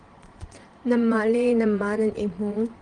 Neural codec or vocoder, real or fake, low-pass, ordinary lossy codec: vocoder, 22.05 kHz, 80 mel bands, Vocos; fake; 9.9 kHz; Opus, 24 kbps